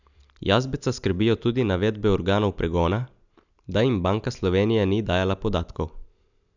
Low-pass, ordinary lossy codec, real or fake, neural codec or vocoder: 7.2 kHz; none; real; none